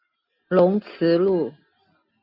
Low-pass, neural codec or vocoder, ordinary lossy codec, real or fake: 5.4 kHz; none; AAC, 48 kbps; real